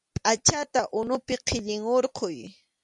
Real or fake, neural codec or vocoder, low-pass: real; none; 10.8 kHz